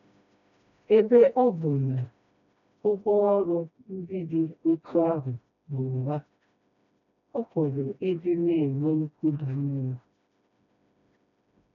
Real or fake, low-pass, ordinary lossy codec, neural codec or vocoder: fake; 7.2 kHz; none; codec, 16 kHz, 1 kbps, FreqCodec, smaller model